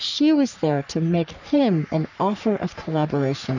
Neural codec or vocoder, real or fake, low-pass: codec, 44.1 kHz, 3.4 kbps, Pupu-Codec; fake; 7.2 kHz